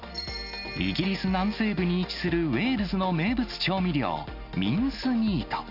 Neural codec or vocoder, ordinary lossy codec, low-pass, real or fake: none; none; 5.4 kHz; real